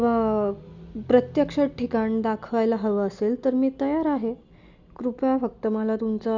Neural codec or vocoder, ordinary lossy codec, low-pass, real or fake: none; Opus, 64 kbps; 7.2 kHz; real